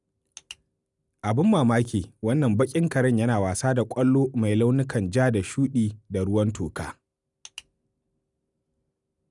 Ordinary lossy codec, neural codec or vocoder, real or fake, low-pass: none; none; real; 10.8 kHz